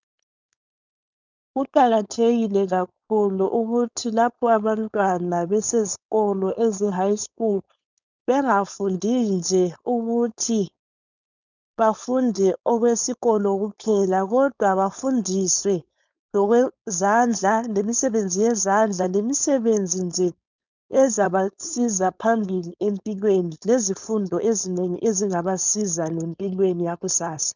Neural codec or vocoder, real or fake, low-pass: codec, 16 kHz, 4.8 kbps, FACodec; fake; 7.2 kHz